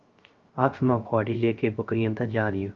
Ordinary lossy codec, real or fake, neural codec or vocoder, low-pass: Opus, 24 kbps; fake; codec, 16 kHz, 0.3 kbps, FocalCodec; 7.2 kHz